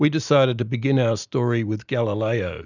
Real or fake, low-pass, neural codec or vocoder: real; 7.2 kHz; none